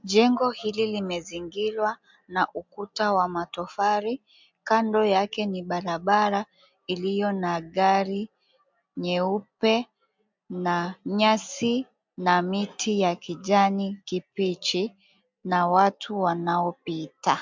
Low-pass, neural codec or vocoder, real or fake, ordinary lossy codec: 7.2 kHz; none; real; MP3, 64 kbps